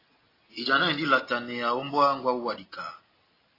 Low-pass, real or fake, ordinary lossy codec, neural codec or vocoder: 5.4 kHz; real; AAC, 24 kbps; none